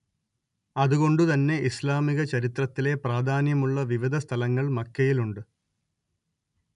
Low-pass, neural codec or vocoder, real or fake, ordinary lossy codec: 10.8 kHz; none; real; none